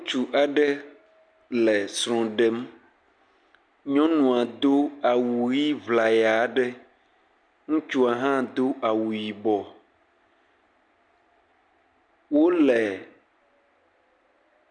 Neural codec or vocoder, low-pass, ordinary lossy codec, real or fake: none; 9.9 kHz; MP3, 96 kbps; real